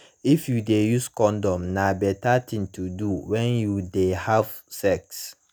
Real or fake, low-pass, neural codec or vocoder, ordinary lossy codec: real; none; none; none